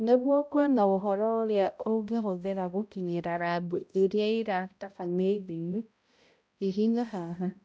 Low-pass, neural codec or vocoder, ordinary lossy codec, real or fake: none; codec, 16 kHz, 0.5 kbps, X-Codec, HuBERT features, trained on balanced general audio; none; fake